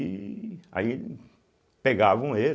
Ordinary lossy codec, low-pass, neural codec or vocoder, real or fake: none; none; none; real